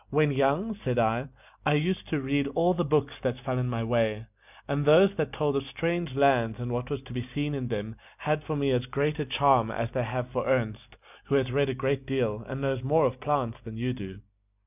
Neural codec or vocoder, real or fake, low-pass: none; real; 3.6 kHz